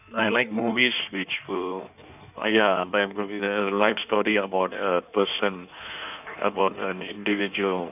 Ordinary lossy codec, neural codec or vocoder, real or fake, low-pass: none; codec, 16 kHz in and 24 kHz out, 1.1 kbps, FireRedTTS-2 codec; fake; 3.6 kHz